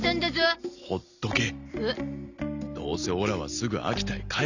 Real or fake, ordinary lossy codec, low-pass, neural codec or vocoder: real; none; 7.2 kHz; none